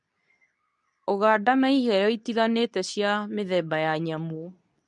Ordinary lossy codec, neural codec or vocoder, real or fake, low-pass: none; codec, 24 kHz, 0.9 kbps, WavTokenizer, medium speech release version 2; fake; 10.8 kHz